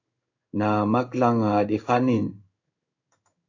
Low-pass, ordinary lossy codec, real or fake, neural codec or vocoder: 7.2 kHz; AAC, 48 kbps; fake; codec, 16 kHz in and 24 kHz out, 1 kbps, XY-Tokenizer